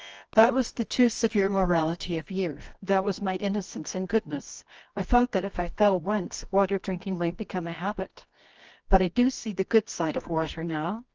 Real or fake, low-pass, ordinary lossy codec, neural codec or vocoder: fake; 7.2 kHz; Opus, 24 kbps; codec, 24 kHz, 0.9 kbps, WavTokenizer, medium music audio release